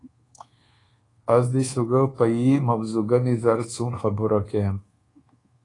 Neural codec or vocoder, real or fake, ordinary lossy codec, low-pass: codec, 24 kHz, 1.2 kbps, DualCodec; fake; AAC, 32 kbps; 10.8 kHz